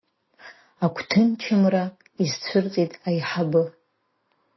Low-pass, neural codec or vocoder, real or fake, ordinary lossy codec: 7.2 kHz; none; real; MP3, 24 kbps